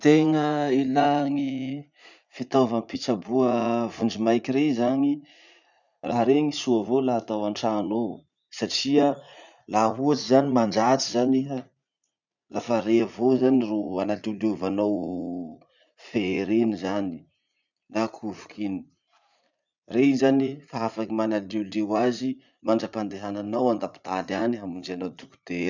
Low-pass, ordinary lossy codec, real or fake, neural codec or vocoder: 7.2 kHz; none; fake; vocoder, 44.1 kHz, 80 mel bands, Vocos